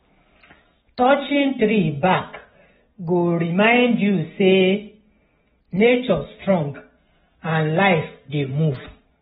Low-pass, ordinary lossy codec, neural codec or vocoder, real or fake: 19.8 kHz; AAC, 16 kbps; none; real